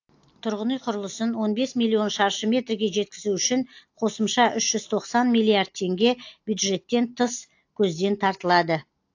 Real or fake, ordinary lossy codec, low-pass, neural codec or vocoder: real; AAC, 48 kbps; 7.2 kHz; none